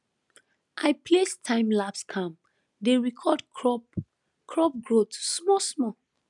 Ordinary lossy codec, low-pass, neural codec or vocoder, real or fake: none; 10.8 kHz; none; real